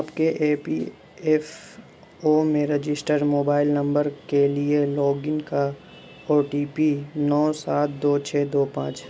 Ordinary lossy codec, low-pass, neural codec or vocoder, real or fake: none; none; none; real